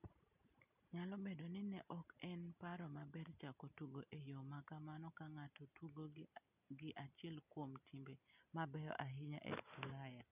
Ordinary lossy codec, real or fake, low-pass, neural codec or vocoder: none; real; 3.6 kHz; none